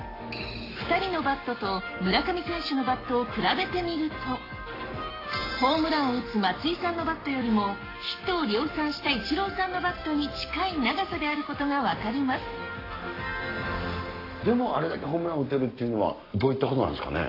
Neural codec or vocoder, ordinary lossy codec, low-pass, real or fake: codec, 44.1 kHz, 7.8 kbps, Pupu-Codec; AAC, 24 kbps; 5.4 kHz; fake